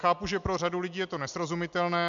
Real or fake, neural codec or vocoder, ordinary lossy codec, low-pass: real; none; MP3, 64 kbps; 7.2 kHz